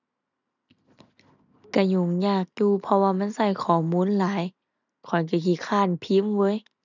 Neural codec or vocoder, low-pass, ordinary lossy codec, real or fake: none; 7.2 kHz; none; real